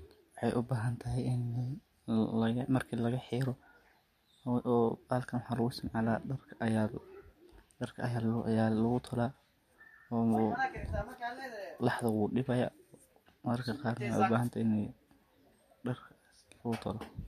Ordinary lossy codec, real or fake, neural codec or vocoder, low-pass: MP3, 64 kbps; real; none; 14.4 kHz